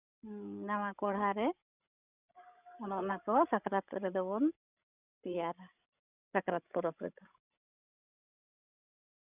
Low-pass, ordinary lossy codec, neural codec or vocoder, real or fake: 3.6 kHz; none; codec, 16 kHz, 16 kbps, FreqCodec, larger model; fake